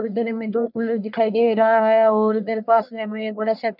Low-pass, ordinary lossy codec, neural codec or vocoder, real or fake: 5.4 kHz; none; codec, 44.1 kHz, 1.7 kbps, Pupu-Codec; fake